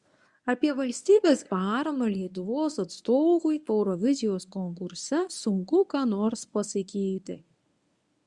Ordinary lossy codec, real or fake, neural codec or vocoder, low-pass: Opus, 64 kbps; fake; codec, 24 kHz, 0.9 kbps, WavTokenizer, medium speech release version 1; 10.8 kHz